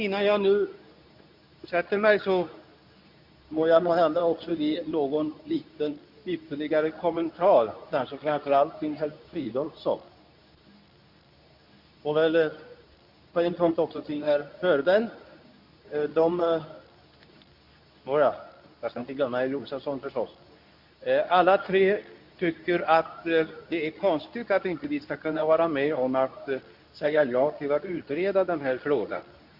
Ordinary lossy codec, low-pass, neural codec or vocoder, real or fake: none; 5.4 kHz; codec, 24 kHz, 0.9 kbps, WavTokenizer, medium speech release version 2; fake